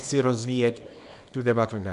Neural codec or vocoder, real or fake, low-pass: codec, 24 kHz, 0.9 kbps, WavTokenizer, small release; fake; 10.8 kHz